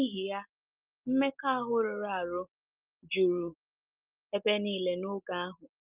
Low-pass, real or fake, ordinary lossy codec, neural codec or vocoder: 3.6 kHz; real; Opus, 32 kbps; none